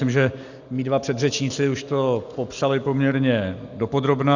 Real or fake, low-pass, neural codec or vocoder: real; 7.2 kHz; none